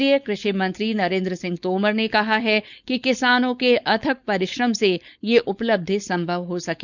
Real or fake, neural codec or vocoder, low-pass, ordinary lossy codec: fake; codec, 16 kHz, 4.8 kbps, FACodec; 7.2 kHz; none